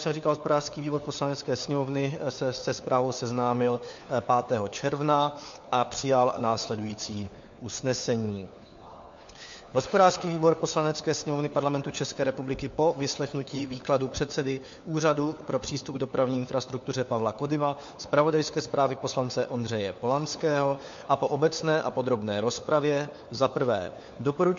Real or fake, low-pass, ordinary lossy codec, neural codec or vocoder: fake; 7.2 kHz; MP3, 48 kbps; codec, 16 kHz, 4 kbps, FunCodec, trained on LibriTTS, 50 frames a second